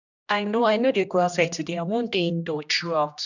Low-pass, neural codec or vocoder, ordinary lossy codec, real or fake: 7.2 kHz; codec, 16 kHz, 1 kbps, X-Codec, HuBERT features, trained on general audio; none; fake